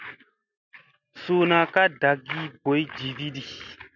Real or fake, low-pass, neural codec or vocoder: real; 7.2 kHz; none